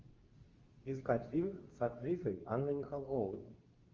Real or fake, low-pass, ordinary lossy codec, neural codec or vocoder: fake; 7.2 kHz; Opus, 32 kbps; codec, 24 kHz, 0.9 kbps, WavTokenizer, medium speech release version 2